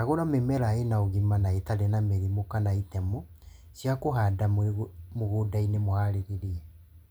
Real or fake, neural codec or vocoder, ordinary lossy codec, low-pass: real; none; none; none